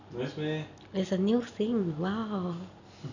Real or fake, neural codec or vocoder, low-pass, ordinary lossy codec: real; none; 7.2 kHz; none